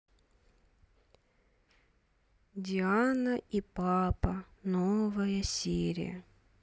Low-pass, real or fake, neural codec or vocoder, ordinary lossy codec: none; real; none; none